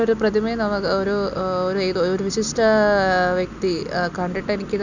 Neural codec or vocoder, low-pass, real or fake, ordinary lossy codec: none; 7.2 kHz; real; none